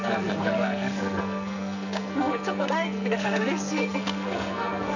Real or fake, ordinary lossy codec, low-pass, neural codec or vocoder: fake; none; 7.2 kHz; codec, 44.1 kHz, 2.6 kbps, SNAC